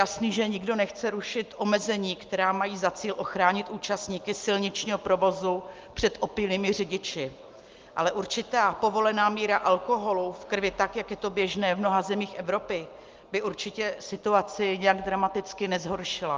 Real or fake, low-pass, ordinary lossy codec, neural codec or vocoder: real; 7.2 kHz; Opus, 32 kbps; none